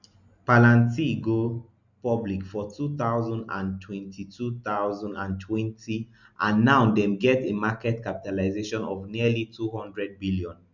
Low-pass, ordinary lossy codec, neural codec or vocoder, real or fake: 7.2 kHz; none; none; real